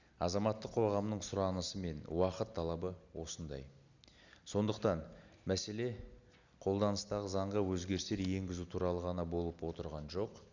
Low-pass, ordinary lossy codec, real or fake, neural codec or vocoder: 7.2 kHz; Opus, 64 kbps; real; none